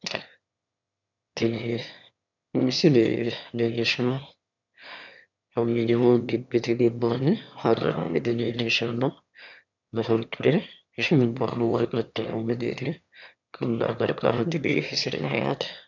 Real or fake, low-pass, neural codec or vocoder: fake; 7.2 kHz; autoencoder, 22.05 kHz, a latent of 192 numbers a frame, VITS, trained on one speaker